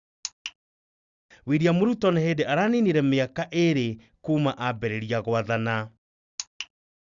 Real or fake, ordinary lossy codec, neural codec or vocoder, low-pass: real; Opus, 64 kbps; none; 7.2 kHz